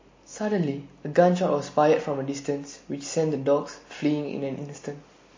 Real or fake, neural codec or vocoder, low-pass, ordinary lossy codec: real; none; 7.2 kHz; MP3, 32 kbps